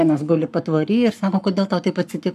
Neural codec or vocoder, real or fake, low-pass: codec, 44.1 kHz, 7.8 kbps, Pupu-Codec; fake; 14.4 kHz